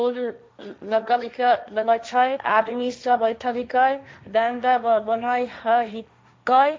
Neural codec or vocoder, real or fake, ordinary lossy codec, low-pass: codec, 16 kHz, 1.1 kbps, Voila-Tokenizer; fake; none; none